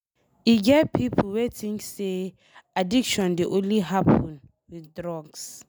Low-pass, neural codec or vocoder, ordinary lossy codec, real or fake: none; none; none; real